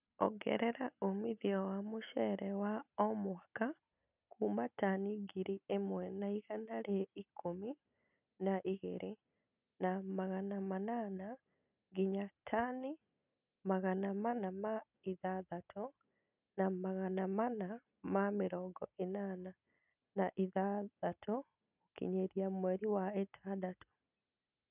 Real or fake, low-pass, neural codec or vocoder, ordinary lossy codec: real; 3.6 kHz; none; none